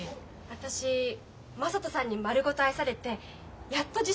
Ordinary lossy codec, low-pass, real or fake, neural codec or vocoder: none; none; real; none